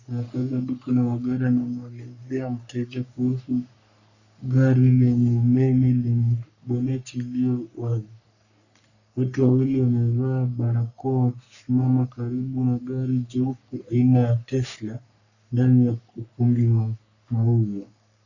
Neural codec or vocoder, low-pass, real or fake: codec, 44.1 kHz, 3.4 kbps, Pupu-Codec; 7.2 kHz; fake